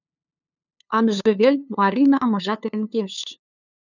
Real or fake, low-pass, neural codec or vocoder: fake; 7.2 kHz; codec, 16 kHz, 2 kbps, FunCodec, trained on LibriTTS, 25 frames a second